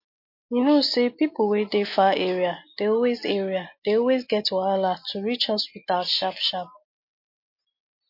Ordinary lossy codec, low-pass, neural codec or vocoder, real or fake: MP3, 32 kbps; 5.4 kHz; none; real